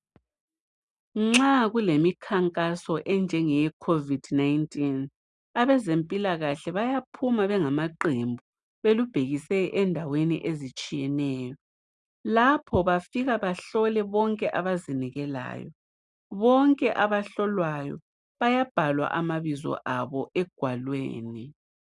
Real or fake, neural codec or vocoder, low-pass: real; none; 10.8 kHz